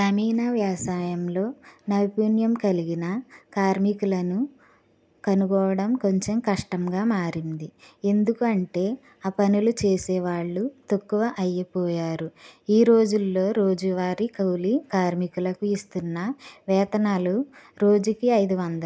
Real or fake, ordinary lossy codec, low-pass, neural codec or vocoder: real; none; none; none